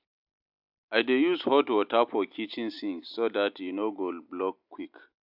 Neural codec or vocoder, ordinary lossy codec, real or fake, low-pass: none; AAC, 48 kbps; real; 5.4 kHz